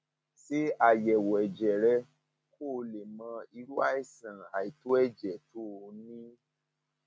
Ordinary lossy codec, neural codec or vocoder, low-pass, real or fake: none; none; none; real